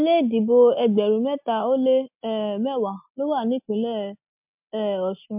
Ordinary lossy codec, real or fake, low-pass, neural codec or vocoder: MP3, 32 kbps; real; 3.6 kHz; none